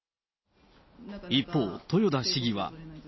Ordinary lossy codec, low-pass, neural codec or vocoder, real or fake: MP3, 24 kbps; 7.2 kHz; none; real